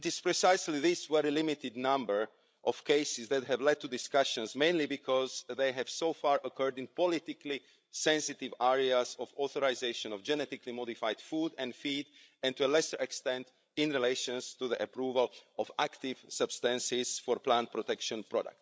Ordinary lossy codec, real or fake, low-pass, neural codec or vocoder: none; fake; none; codec, 16 kHz, 16 kbps, FreqCodec, larger model